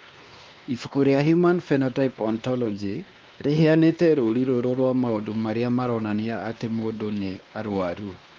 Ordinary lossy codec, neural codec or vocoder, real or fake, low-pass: Opus, 32 kbps; codec, 16 kHz, 2 kbps, X-Codec, WavLM features, trained on Multilingual LibriSpeech; fake; 7.2 kHz